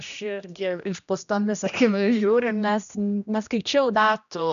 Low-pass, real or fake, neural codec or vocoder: 7.2 kHz; fake; codec, 16 kHz, 1 kbps, X-Codec, HuBERT features, trained on general audio